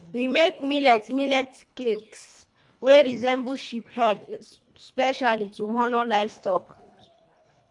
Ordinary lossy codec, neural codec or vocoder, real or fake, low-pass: AAC, 64 kbps; codec, 24 kHz, 1.5 kbps, HILCodec; fake; 10.8 kHz